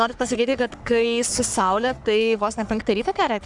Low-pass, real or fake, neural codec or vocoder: 10.8 kHz; fake; codec, 44.1 kHz, 3.4 kbps, Pupu-Codec